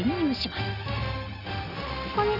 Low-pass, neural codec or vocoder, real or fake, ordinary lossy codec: 5.4 kHz; none; real; none